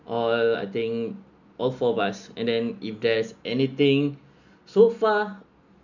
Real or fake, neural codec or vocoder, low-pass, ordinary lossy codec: real; none; 7.2 kHz; none